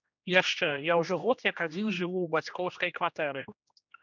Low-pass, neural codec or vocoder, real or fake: 7.2 kHz; codec, 16 kHz, 1 kbps, X-Codec, HuBERT features, trained on general audio; fake